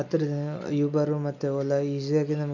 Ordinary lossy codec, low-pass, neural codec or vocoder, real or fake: none; 7.2 kHz; none; real